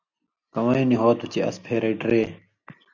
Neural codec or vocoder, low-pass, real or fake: none; 7.2 kHz; real